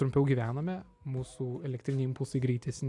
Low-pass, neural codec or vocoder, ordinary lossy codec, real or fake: 10.8 kHz; none; AAC, 64 kbps; real